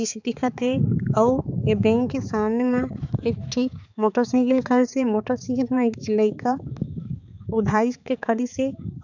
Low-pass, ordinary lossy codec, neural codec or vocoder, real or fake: 7.2 kHz; none; codec, 16 kHz, 4 kbps, X-Codec, HuBERT features, trained on balanced general audio; fake